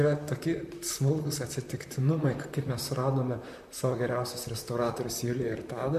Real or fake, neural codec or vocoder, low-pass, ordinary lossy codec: fake; vocoder, 44.1 kHz, 128 mel bands, Pupu-Vocoder; 14.4 kHz; MP3, 64 kbps